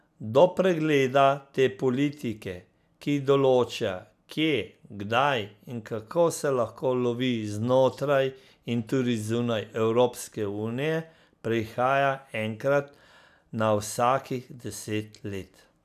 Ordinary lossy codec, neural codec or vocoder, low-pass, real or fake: none; none; 14.4 kHz; real